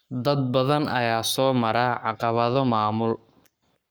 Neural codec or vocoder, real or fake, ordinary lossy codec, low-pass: codec, 44.1 kHz, 7.8 kbps, Pupu-Codec; fake; none; none